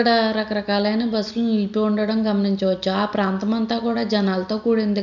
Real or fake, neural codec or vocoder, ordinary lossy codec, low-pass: real; none; none; 7.2 kHz